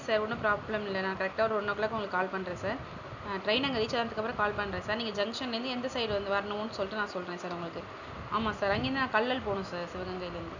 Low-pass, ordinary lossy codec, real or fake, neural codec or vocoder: 7.2 kHz; none; real; none